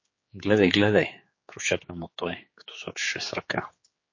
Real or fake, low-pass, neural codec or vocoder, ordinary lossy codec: fake; 7.2 kHz; codec, 16 kHz, 4 kbps, X-Codec, HuBERT features, trained on general audio; MP3, 32 kbps